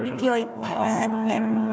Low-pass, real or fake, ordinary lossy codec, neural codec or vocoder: none; fake; none; codec, 16 kHz, 1 kbps, FunCodec, trained on Chinese and English, 50 frames a second